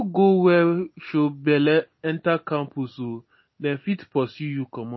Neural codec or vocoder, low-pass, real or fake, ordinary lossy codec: none; 7.2 kHz; real; MP3, 24 kbps